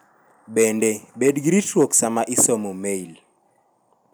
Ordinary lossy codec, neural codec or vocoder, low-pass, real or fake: none; none; none; real